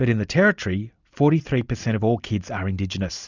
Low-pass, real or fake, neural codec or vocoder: 7.2 kHz; real; none